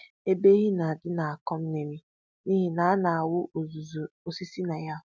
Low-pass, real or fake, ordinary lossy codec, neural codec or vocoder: none; real; none; none